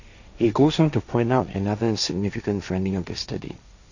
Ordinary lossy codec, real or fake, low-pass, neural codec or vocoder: none; fake; 7.2 kHz; codec, 16 kHz, 1.1 kbps, Voila-Tokenizer